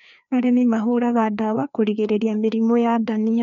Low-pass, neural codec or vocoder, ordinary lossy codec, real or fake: 7.2 kHz; codec, 16 kHz, 2 kbps, FreqCodec, larger model; none; fake